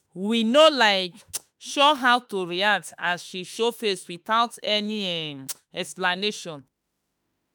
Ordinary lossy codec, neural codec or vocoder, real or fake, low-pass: none; autoencoder, 48 kHz, 32 numbers a frame, DAC-VAE, trained on Japanese speech; fake; none